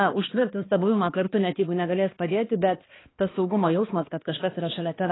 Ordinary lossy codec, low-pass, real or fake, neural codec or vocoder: AAC, 16 kbps; 7.2 kHz; fake; codec, 16 kHz, 2 kbps, X-Codec, HuBERT features, trained on balanced general audio